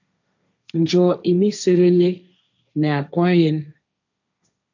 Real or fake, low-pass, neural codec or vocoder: fake; 7.2 kHz; codec, 16 kHz, 1.1 kbps, Voila-Tokenizer